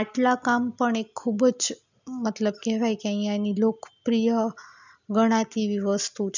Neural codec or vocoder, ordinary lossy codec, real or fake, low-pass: none; none; real; 7.2 kHz